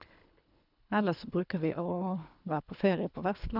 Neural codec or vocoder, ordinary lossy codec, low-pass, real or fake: codec, 24 kHz, 3 kbps, HILCodec; none; 5.4 kHz; fake